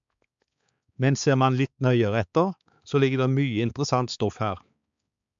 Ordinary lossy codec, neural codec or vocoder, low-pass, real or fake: MP3, 64 kbps; codec, 16 kHz, 4 kbps, X-Codec, HuBERT features, trained on balanced general audio; 7.2 kHz; fake